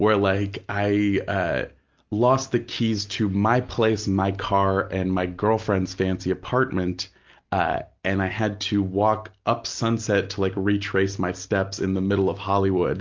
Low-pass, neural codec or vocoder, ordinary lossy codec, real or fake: 7.2 kHz; none; Opus, 32 kbps; real